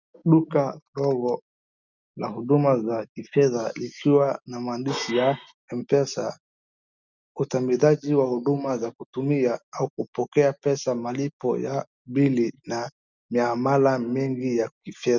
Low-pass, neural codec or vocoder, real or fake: 7.2 kHz; none; real